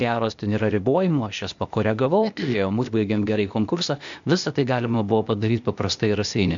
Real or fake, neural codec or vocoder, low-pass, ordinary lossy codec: fake; codec, 16 kHz, 0.8 kbps, ZipCodec; 7.2 kHz; MP3, 64 kbps